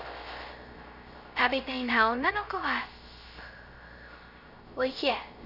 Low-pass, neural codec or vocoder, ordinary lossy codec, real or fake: 5.4 kHz; codec, 16 kHz, 0.3 kbps, FocalCodec; none; fake